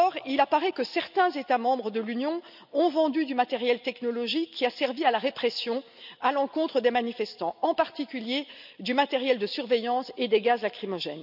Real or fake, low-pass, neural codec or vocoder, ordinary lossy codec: real; 5.4 kHz; none; none